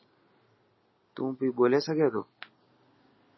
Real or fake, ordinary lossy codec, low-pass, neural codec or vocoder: real; MP3, 24 kbps; 7.2 kHz; none